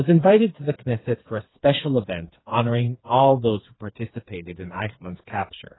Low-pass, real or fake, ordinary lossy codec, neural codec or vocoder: 7.2 kHz; fake; AAC, 16 kbps; codec, 16 kHz, 4 kbps, FreqCodec, smaller model